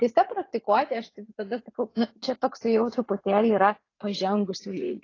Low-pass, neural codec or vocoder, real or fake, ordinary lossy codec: 7.2 kHz; vocoder, 44.1 kHz, 80 mel bands, Vocos; fake; AAC, 32 kbps